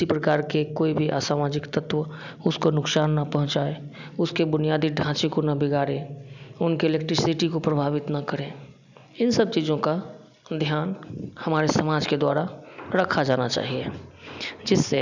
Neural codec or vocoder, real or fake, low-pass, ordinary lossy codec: none; real; 7.2 kHz; none